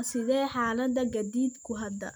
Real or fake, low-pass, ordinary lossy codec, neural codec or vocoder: real; none; none; none